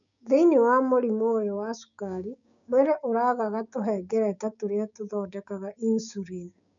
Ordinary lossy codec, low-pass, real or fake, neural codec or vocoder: none; 7.2 kHz; fake; codec, 16 kHz, 6 kbps, DAC